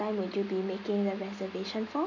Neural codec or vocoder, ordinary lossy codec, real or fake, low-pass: none; none; real; 7.2 kHz